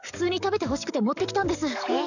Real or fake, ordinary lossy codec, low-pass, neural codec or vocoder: fake; none; 7.2 kHz; codec, 44.1 kHz, 7.8 kbps, DAC